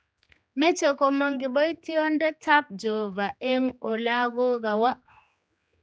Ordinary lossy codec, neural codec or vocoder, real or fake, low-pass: none; codec, 16 kHz, 2 kbps, X-Codec, HuBERT features, trained on general audio; fake; none